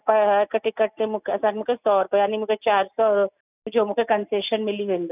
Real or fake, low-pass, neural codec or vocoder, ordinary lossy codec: real; 3.6 kHz; none; none